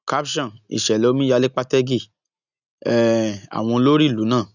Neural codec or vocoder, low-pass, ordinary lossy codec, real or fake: none; 7.2 kHz; none; real